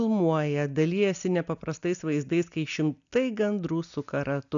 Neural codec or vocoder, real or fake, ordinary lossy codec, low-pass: none; real; MP3, 96 kbps; 7.2 kHz